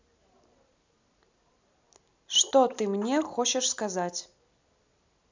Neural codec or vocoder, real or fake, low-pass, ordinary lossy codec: none; real; 7.2 kHz; MP3, 64 kbps